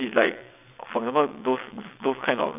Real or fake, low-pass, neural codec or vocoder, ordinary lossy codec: fake; 3.6 kHz; vocoder, 22.05 kHz, 80 mel bands, WaveNeXt; none